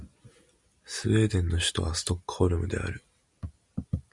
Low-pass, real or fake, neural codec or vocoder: 10.8 kHz; real; none